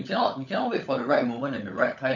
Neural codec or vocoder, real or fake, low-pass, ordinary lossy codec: codec, 16 kHz, 16 kbps, FunCodec, trained on LibriTTS, 50 frames a second; fake; 7.2 kHz; none